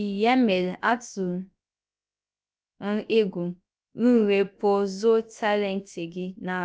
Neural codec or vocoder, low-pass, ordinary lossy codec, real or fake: codec, 16 kHz, about 1 kbps, DyCAST, with the encoder's durations; none; none; fake